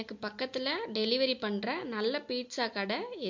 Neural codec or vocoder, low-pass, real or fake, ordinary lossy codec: none; 7.2 kHz; real; MP3, 48 kbps